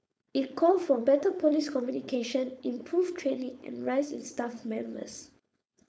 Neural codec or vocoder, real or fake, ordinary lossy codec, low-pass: codec, 16 kHz, 4.8 kbps, FACodec; fake; none; none